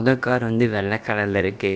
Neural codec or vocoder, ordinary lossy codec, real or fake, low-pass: codec, 16 kHz, about 1 kbps, DyCAST, with the encoder's durations; none; fake; none